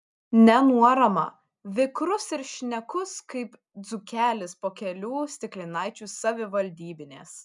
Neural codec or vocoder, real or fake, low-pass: none; real; 10.8 kHz